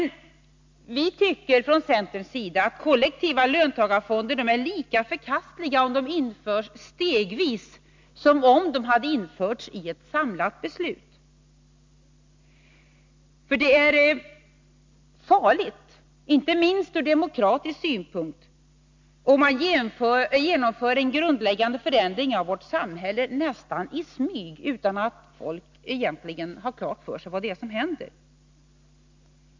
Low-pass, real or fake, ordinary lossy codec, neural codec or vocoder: 7.2 kHz; real; MP3, 64 kbps; none